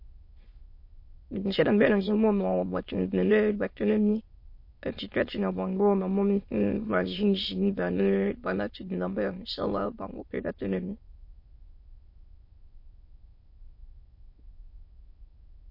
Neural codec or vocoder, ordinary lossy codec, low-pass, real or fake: autoencoder, 22.05 kHz, a latent of 192 numbers a frame, VITS, trained on many speakers; MP3, 32 kbps; 5.4 kHz; fake